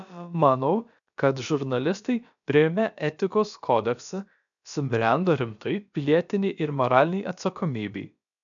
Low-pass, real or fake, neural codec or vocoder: 7.2 kHz; fake; codec, 16 kHz, about 1 kbps, DyCAST, with the encoder's durations